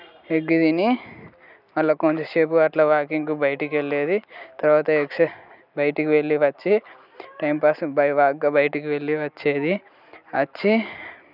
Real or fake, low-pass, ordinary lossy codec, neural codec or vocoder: real; 5.4 kHz; none; none